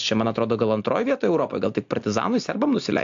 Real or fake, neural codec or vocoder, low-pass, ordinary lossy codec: real; none; 7.2 kHz; AAC, 64 kbps